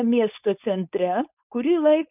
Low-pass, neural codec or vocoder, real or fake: 3.6 kHz; codec, 16 kHz, 4.8 kbps, FACodec; fake